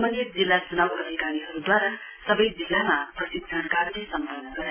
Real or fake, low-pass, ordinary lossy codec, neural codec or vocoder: real; 3.6 kHz; MP3, 16 kbps; none